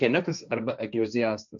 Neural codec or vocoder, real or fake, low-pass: codec, 16 kHz, 1.1 kbps, Voila-Tokenizer; fake; 7.2 kHz